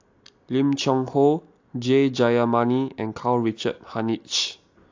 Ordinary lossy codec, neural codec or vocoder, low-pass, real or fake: AAC, 48 kbps; none; 7.2 kHz; real